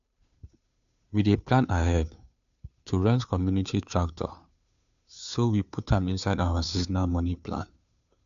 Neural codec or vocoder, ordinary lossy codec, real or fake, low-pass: codec, 16 kHz, 2 kbps, FunCodec, trained on Chinese and English, 25 frames a second; none; fake; 7.2 kHz